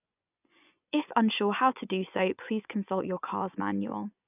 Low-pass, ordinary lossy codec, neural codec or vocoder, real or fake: 3.6 kHz; none; vocoder, 24 kHz, 100 mel bands, Vocos; fake